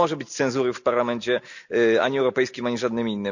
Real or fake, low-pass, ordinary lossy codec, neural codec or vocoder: real; 7.2 kHz; none; none